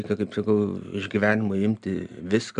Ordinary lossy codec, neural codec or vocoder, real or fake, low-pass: MP3, 96 kbps; none; real; 9.9 kHz